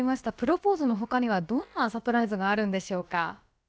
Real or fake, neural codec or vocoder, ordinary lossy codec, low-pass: fake; codec, 16 kHz, about 1 kbps, DyCAST, with the encoder's durations; none; none